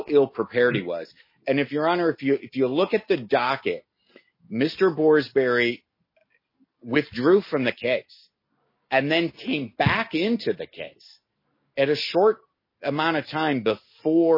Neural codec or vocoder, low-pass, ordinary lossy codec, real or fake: none; 5.4 kHz; MP3, 24 kbps; real